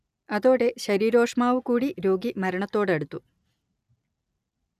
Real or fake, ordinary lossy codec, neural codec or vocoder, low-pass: real; none; none; 14.4 kHz